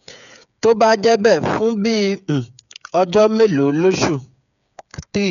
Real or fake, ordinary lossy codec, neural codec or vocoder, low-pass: fake; none; codec, 16 kHz, 8 kbps, FreqCodec, smaller model; 7.2 kHz